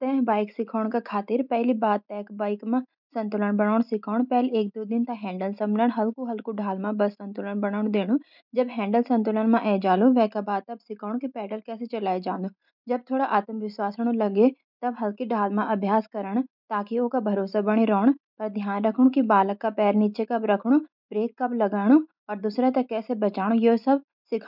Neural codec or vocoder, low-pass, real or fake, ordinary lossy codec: none; 5.4 kHz; real; none